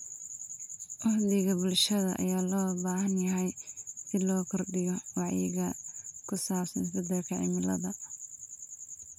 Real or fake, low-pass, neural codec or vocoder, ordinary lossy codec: real; 19.8 kHz; none; none